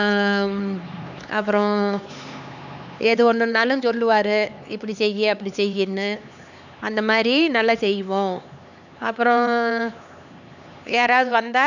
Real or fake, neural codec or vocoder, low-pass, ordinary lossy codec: fake; codec, 16 kHz, 4 kbps, X-Codec, HuBERT features, trained on LibriSpeech; 7.2 kHz; none